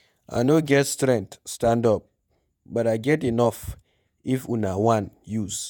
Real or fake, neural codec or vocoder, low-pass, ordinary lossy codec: fake; vocoder, 48 kHz, 128 mel bands, Vocos; none; none